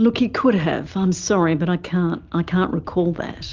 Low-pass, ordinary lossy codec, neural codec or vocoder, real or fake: 7.2 kHz; Opus, 32 kbps; none; real